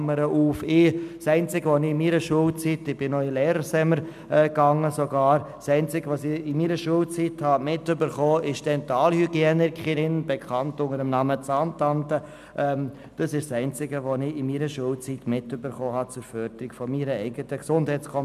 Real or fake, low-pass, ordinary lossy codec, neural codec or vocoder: real; 14.4 kHz; none; none